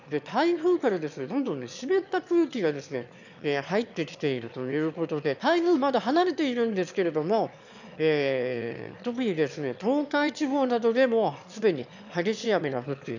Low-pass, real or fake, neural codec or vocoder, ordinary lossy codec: 7.2 kHz; fake; autoencoder, 22.05 kHz, a latent of 192 numbers a frame, VITS, trained on one speaker; none